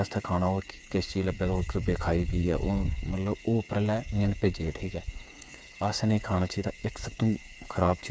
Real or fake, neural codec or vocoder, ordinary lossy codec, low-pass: fake; codec, 16 kHz, 8 kbps, FreqCodec, smaller model; none; none